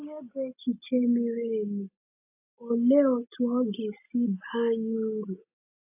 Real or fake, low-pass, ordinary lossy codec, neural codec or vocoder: real; 3.6 kHz; AAC, 32 kbps; none